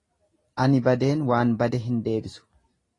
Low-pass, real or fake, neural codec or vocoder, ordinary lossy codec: 10.8 kHz; real; none; AAC, 32 kbps